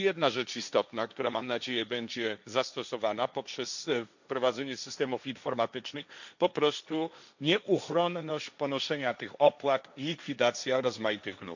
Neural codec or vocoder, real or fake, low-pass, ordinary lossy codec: codec, 16 kHz, 1.1 kbps, Voila-Tokenizer; fake; 7.2 kHz; none